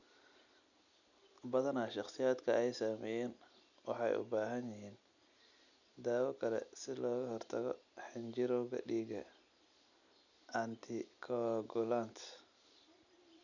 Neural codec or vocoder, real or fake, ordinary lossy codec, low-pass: none; real; none; 7.2 kHz